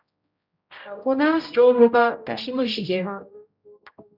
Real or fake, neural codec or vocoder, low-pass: fake; codec, 16 kHz, 0.5 kbps, X-Codec, HuBERT features, trained on general audio; 5.4 kHz